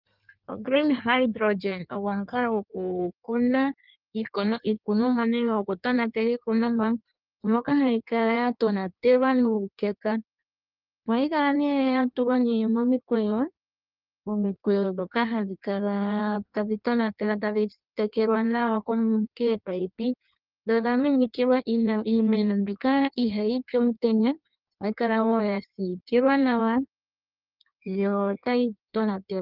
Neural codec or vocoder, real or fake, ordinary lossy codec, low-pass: codec, 16 kHz in and 24 kHz out, 1.1 kbps, FireRedTTS-2 codec; fake; Opus, 24 kbps; 5.4 kHz